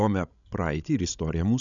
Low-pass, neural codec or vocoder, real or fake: 7.2 kHz; codec, 16 kHz, 16 kbps, FreqCodec, larger model; fake